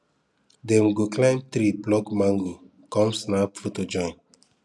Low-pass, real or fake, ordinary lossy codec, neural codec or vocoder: none; real; none; none